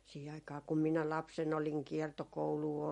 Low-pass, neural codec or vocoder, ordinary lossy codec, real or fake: 19.8 kHz; none; MP3, 48 kbps; real